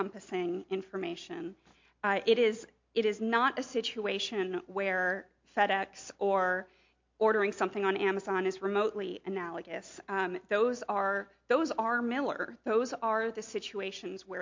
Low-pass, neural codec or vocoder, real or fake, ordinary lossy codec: 7.2 kHz; none; real; MP3, 48 kbps